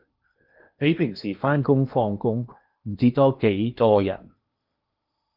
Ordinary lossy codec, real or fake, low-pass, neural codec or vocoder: Opus, 24 kbps; fake; 5.4 kHz; codec, 16 kHz in and 24 kHz out, 0.8 kbps, FocalCodec, streaming, 65536 codes